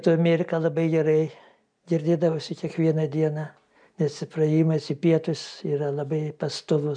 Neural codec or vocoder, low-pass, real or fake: none; 9.9 kHz; real